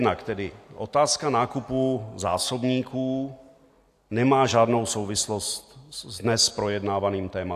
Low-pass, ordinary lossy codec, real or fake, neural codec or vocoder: 14.4 kHz; AAC, 64 kbps; real; none